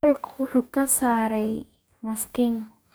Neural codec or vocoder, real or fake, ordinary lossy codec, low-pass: codec, 44.1 kHz, 2.6 kbps, DAC; fake; none; none